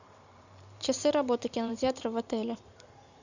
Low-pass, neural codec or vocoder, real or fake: 7.2 kHz; vocoder, 44.1 kHz, 128 mel bands every 512 samples, BigVGAN v2; fake